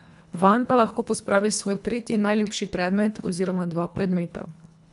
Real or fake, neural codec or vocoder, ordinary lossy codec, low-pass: fake; codec, 24 kHz, 1.5 kbps, HILCodec; none; 10.8 kHz